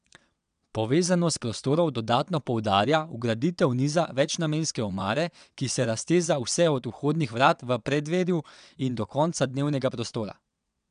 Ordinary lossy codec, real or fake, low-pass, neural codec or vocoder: none; fake; 9.9 kHz; vocoder, 22.05 kHz, 80 mel bands, WaveNeXt